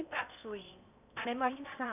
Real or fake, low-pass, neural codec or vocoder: fake; 3.6 kHz; codec, 16 kHz in and 24 kHz out, 0.8 kbps, FocalCodec, streaming, 65536 codes